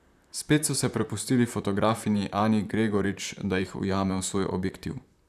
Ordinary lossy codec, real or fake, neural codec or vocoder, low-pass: none; fake; vocoder, 44.1 kHz, 128 mel bands, Pupu-Vocoder; 14.4 kHz